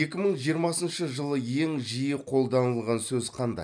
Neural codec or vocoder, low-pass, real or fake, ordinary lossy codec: none; 9.9 kHz; real; none